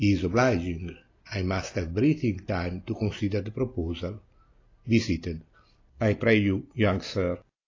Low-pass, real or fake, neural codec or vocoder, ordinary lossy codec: 7.2 kHz; real; none; AAC, 32 kbps